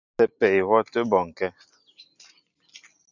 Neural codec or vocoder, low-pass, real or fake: none; 7.2 kHz; real